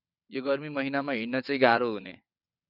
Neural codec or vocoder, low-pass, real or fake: vocoder, 22.05 kHz, 80 mel bands, WaveNeXt; 5.4 kHz; fake